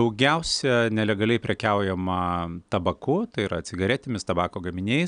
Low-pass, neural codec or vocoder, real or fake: 9.9 kHz; none; real